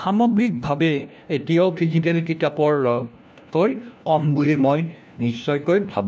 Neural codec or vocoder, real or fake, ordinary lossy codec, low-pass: codec, 16 kHz, 1 kbps, FunCodec, trained on LibriTTS, 50 frames a second; fake; none; none